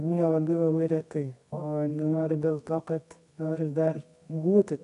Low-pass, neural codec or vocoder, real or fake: 10.8 kHz; codec, 24 kHz, 0.9 kbps, WavTokenizer, medium music audio release; fake